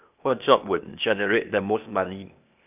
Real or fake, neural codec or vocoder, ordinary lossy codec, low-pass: fake; codec, 16 kHz, 0.8 kbps, ZipCodec; none; 3.6 kHz